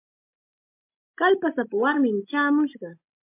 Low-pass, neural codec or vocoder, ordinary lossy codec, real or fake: 3.6 kHz; none; MP3, 32 kbps; real